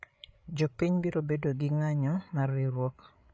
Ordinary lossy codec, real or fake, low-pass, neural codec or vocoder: none; fake; none; codec, 16 kHz, 8 kbps, FreqCodec, larger model